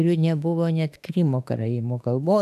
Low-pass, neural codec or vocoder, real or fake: 14.4 kHz; autoencoder, 48 kHz, 32 numbers a frame, DAC-VAE, trained on Japanese speech; fake